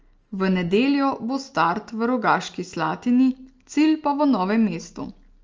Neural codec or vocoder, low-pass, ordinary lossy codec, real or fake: none; 7.2 kHz; Opus, 24 kbps; real